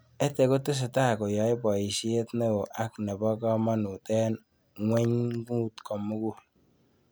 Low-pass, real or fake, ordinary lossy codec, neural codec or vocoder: none; real; none; none